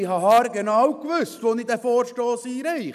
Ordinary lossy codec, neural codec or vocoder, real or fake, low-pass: none; none; real; 14.4 kHz